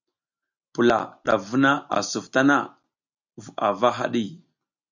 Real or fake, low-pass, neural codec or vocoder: real; 7.2 kHz; none